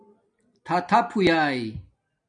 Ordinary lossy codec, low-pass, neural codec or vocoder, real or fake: MP3, 96 kbps; 9.9 kHz; none; real